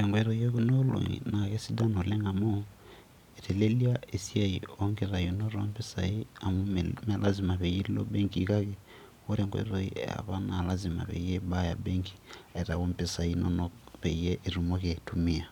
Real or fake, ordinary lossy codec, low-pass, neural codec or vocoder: real; none; 19.8 kHz; none